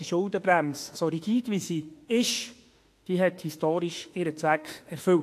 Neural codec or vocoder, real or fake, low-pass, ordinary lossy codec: autoencoder, 48 kHz, 32 numbers a frame, DAC-VAE, trained on Japanese speech; fake; 14.4 kHz; AAC, 64 kbps